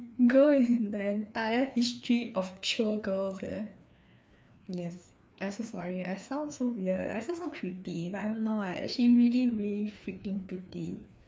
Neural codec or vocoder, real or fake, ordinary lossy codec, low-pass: codec, 16 kHz, 2 kbps, FreqCodec, larger model; fake; none; none